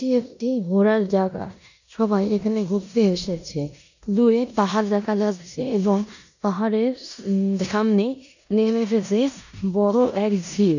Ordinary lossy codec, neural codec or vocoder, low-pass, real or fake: none; codec, 16 kHz in and 24 kHz out, 0.9 kbps, LongCat-Audio-Codec, four codebook decoder; 7.2 kHz; fake